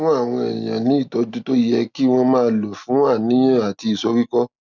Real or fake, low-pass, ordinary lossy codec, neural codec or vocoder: real; 7.2 kHz; none; none